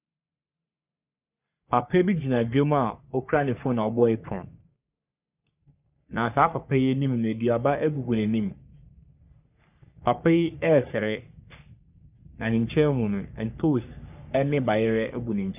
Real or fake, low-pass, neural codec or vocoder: fake; 3.6 kHz; codec, 44.1 kHz, 3.4 kbps, Pupu-Codec